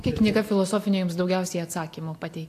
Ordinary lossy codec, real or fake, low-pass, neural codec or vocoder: AAC, 64 kbps; real; 14.4 kHz; none